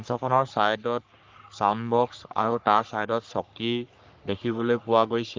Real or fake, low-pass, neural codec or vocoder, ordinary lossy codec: fake; 7.2 kHz; codec, 44.1 kHz, 3.4 kbps, Pupu-Codec; Opus, 24 kbps